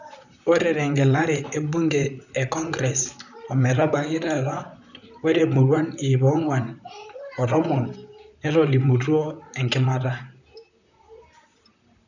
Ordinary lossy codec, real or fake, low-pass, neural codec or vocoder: none; fake; 7.2 kHz; vocoder, 44.1 kHz, 128 mel bands, Pupu-Vocoder